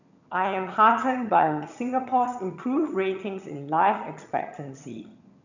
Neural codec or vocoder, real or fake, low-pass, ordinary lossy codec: vocoder, 22.05 kHz, 80 mel bands, HiFi-GAN; fake; 7.2 kHz; none